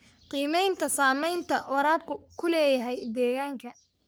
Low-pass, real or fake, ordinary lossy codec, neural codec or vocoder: none; fake; none; codec, 44.1 kHz, 3.4 kbps, Pupu-Codec